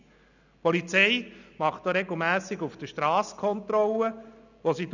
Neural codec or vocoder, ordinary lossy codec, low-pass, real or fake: none; none; 7.2 kHz; real